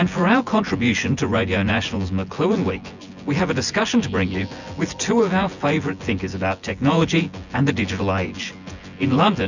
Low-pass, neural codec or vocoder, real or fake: 7.2 kHz; vocoder, 24 kHz, 100 mel bands, Vocos; fake